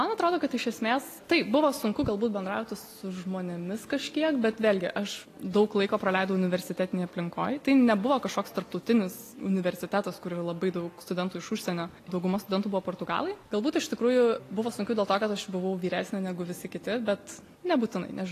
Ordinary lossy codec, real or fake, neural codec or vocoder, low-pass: AAC, 48 kbps; real; none; 14.4 kHz